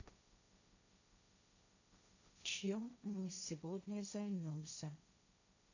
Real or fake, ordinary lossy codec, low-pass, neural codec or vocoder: fake; none; 7.2 kHz; codec, 16 kHz, 1.1 kbps, Voila-Tokenizer